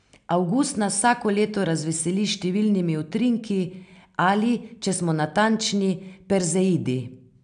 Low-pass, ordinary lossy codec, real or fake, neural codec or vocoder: 9.9 kHz; none; real; none